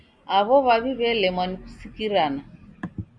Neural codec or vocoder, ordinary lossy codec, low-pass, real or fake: none; Opus, 64 kbps; 9.9 kHz; real